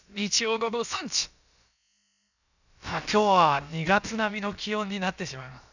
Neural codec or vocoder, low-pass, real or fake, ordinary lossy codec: codec, 16 kHz, about 1 kbps, DyCAST, with the encoder's durations; 7.2 kHz; fake; none